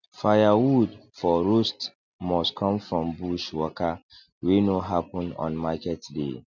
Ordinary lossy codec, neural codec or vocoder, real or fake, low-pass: none; none; real; 7.2 kHz